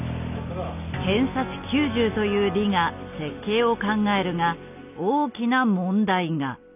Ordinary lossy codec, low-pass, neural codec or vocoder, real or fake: none; 3.6 kHz; none; real